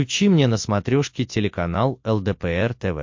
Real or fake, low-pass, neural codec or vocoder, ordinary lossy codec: real; 7.2 kHz; none; MP3, 48 kbps